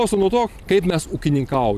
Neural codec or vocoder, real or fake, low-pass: vocoder, 44.1 kHz, 128 mel bands every 256 samples, BigVGAN v2; fake; 14.4 kHz